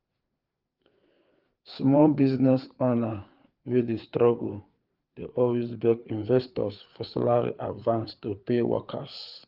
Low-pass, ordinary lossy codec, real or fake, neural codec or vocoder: 5.4 kHz; Opus, 32 kbps; fake; codec, 16 kHz, 4 kbps, FreqCodec, larger model